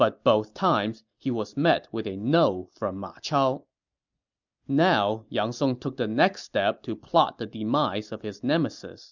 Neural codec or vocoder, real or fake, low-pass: none; real; 7.2 kHz